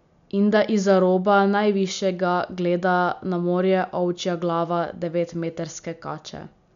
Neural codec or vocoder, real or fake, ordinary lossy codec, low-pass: none; real; MP3, 96 kbps; 7.2 kHz